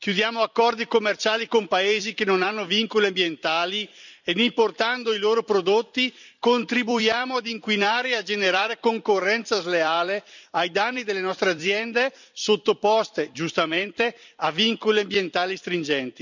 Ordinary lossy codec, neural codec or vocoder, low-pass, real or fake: none; vocoder, 44.1 kHz, 128 mel bands every 256 samples, BigVGAN v2; 7.2 kHz; fake